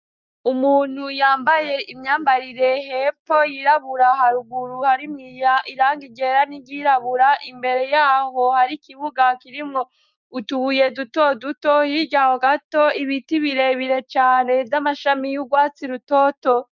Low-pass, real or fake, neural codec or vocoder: 7.2 kHz; fake; codec, 16 kHz, 6 kbps, DAC